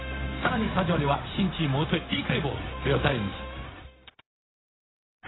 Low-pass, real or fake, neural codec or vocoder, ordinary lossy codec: 7.2 kHz; fake; codec, 16 kHz, 0.4 kbps, LongCat-Audio-Codec; AAC, 16 kbps